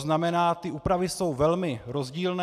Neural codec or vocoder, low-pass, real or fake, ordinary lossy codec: none; 14.4 kHz; real; AAC, 96 kbps